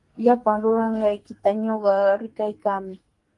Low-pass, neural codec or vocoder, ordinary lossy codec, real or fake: 10.8 kHz; codec, 44.1 kHz, 2.6 kbps, SNAC; Opus, 24 kbps; fake